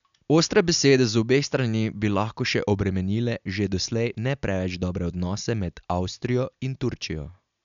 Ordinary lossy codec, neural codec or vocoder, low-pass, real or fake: none; none; 7.2 kHz; real